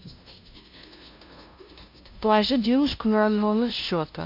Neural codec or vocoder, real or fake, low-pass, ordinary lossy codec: codec, 16 kHz, 0.5 kbps, FunCodec, trained on LibriTTS, 25 frames a second; fake; 5.4 kHz; MP3, 48 kbps